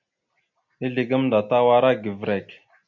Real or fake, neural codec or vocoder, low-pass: real; none; 7.2 kHz